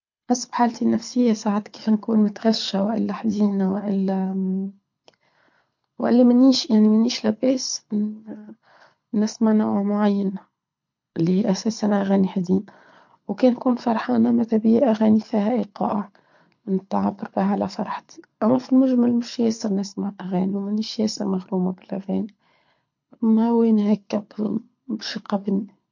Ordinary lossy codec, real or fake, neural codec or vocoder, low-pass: MP3, 48 kbps; fake; codec, 24 kHz, 6 kbps, HILCodec; 7.2 kHz